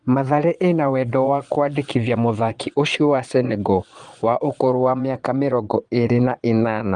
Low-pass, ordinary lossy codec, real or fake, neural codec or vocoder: 9.9 kHz; Opus, 24 kbps; fake; vocoder, 22.05 kHz, 80 mel bands, Vocos